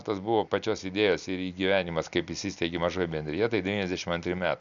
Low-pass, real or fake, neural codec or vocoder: 7.2 kHz; real; none